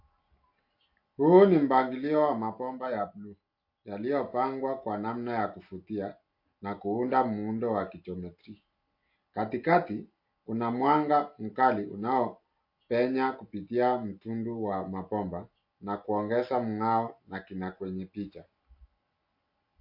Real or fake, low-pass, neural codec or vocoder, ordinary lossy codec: real; 5.4 kHz; none; MP3, 32 kbps